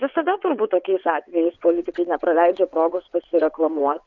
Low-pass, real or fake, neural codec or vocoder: 7.2 kHz; fake; vocoder, 22.05 kHz, 80 mel bands, WaveNeXt